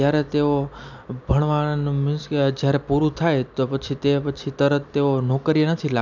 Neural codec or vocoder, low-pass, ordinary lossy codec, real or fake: none; 7.2 kHz; none; real